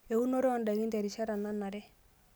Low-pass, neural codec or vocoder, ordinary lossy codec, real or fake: none; none; none; real